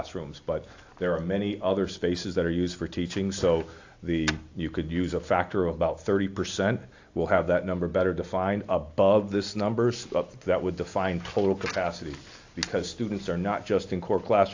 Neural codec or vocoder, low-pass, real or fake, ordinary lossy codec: none; 7.2 kHz; real; AAC, 48 kbps